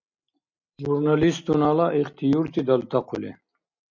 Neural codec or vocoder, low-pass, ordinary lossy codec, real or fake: none; 7.2 kHz; MP3, 64 kbps; real